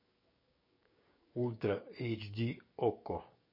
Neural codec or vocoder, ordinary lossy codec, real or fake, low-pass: codec, 16 kHz, 6 kbps, DAC; MP3, 24 kbps; fake; 5.4 kHz